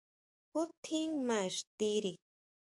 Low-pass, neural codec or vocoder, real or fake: 10.8 kHz; codec, 44.1 kHz, 7.8 kbps, DAC; fake